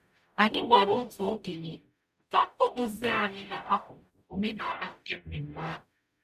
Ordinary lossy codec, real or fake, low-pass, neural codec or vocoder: none; fake; 14.4 kHz; codec, 44.1 kHz, 0.9 kbps, DAC